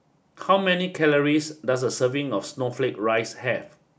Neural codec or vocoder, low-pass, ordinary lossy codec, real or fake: none; none; none; real